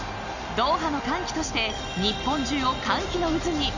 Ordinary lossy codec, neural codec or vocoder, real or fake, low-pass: none; none; real; 7.2 kHz